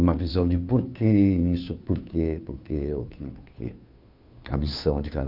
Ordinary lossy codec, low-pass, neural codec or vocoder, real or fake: Opus, 64 kbps; 5.4 kHz; codec, 16 kHz, 2 kbps, FunCodec, trained on Chinese and English, 25 frames a second; fake